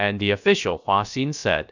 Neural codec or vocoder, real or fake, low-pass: codec, 16 kHz, about 1 kbps, DyCAST, with the encoder's durations; fake; 7.2 kHz